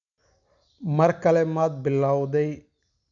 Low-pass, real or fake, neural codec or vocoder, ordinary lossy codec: 7.2 kHz; real; none; MP3, 96 kbps